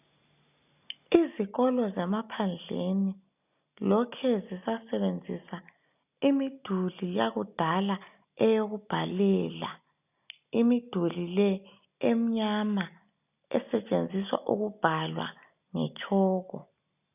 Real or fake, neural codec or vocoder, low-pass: real; none; 3.6 kHz